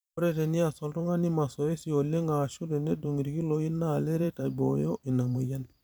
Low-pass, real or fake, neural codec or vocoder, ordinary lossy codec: none; fake; vocoder, 44.1 kHz, 128 mel bands every 512 samples, BigVGAN v2; none